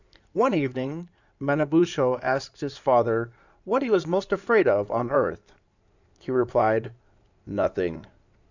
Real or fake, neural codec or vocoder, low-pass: fake; codec, 16 kHz in and 24 kHz out, 2.2 kbps, FireRedTTS-2 codec; 7.2 kHz